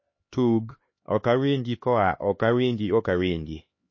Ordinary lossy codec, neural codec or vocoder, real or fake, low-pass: MP3, 32 kbps; codec, 16 kHz, 2 kbps, X-Codec, HuBERT features, trained on LibriSpeech; fake; 7.2 kHz